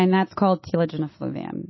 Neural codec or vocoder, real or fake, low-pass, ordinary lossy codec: none; real; 7.2 kHz; MP3, 24 kbps